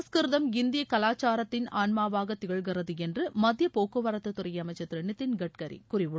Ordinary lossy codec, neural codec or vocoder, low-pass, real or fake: none; none; none; real